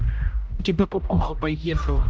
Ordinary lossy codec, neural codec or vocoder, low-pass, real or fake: none; codec, 16 kHz, 0.5 kbps, X-Codec, HuBERT features, trained on general audio; none; fake